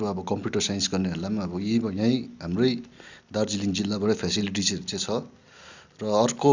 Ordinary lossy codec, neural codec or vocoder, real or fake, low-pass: Opus, 64 kbps; none; real; 7.2 kHz